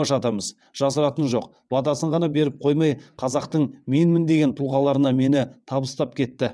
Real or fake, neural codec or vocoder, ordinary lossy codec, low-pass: fake; vocoder, 22.05 kHz, 80 mel bands, WaveNeXt; none; none